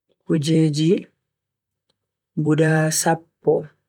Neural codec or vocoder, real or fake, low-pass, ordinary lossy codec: vocoder, 44.1 kHz, 128 mel bands, Pupu-Vocoder; fake; 19.8 kHz; none